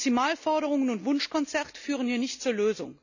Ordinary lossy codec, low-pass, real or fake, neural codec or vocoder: none; 7.2 kHz; real; none